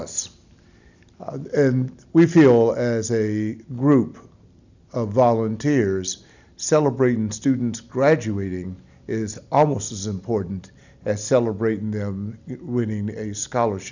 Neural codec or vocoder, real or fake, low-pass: none; real; 7.2 kHz